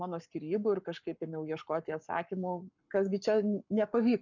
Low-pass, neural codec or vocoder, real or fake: 7.2 kHz; codec, 44.1 kHz, 7.8 kbps, Pupu-Codec; fake